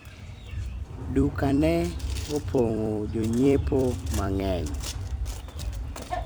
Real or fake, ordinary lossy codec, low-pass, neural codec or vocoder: fake; none; none; vocoder, 44.1 kHz, 128 mel bands every 256 samples, BigVGAN v2